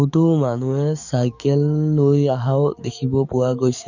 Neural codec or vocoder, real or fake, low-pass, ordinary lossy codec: codec, 44.1 kHz, 7.8 kbps, DAC; fake; 7.2 kHz; none